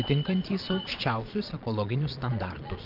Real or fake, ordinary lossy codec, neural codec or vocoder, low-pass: fake; Opus, 24 kbps; vocoder, 22.05 kHz, 80 mel bands, Vocos; 5.4 kHz